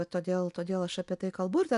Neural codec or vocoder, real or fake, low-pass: none; real; 10.8 kHz